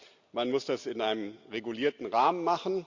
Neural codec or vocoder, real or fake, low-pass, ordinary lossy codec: none; real; 7.2 kHz; Opus, 64 kbps